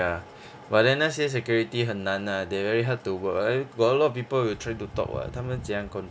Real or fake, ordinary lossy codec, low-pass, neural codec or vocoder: real; none; none; none